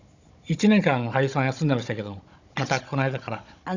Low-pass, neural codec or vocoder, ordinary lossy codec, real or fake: 7.2 kHz; codec, 16 kHz, 16 kbps, FunCodec, trained on Chinese and English, 50 frames a second; none; fake